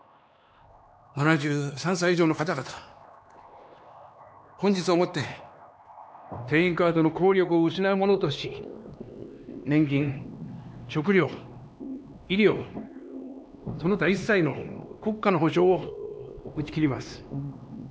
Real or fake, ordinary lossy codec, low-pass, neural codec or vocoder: fake; none; none; codec, 16 kHz, 2 kbps, X-Codec, HuBERT features, trained on LibriSpeech